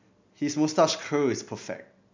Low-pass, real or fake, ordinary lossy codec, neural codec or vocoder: 7.2 kHz; real; MP3, 64 kbps; none